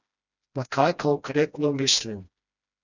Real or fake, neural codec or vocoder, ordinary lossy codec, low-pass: fake; codec, 16 kHz, 1 kbps, FreqCodec, smaller model; none; 7.2 kHz